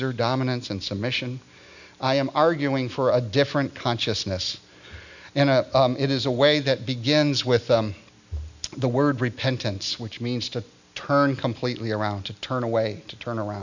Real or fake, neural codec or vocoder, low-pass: real; none; 7.2 kHz